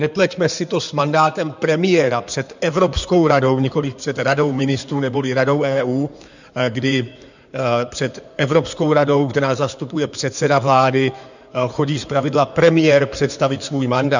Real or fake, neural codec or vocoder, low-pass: fake; codec, 16 kHz in and 24 kHz out, 2.2 kbps, FireRedTTS-2 codec; 7.2 kHz